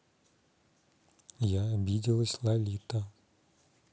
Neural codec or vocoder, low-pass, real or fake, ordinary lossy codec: none; none; real; none